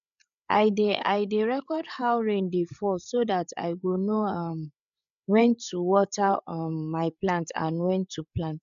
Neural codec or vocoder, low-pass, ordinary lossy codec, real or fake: codec, 16 kHz, 8 kbps, FreqCodec, larger model; 7.2 kHz; none; fake